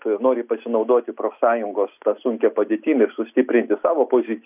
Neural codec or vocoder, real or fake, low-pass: none; real; 3.6 kHz